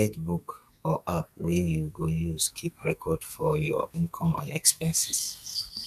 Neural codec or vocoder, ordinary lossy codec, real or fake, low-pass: codec, 32 kHz, 1.9 kbps, SNAC; none; fake; 14.4 kHz